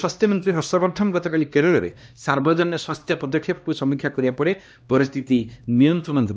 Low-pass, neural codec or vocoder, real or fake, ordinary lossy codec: none; codec, 16 kHz, 1 kbps, X-Codec, HuBERT features, trained on LibriSpeech; fake; none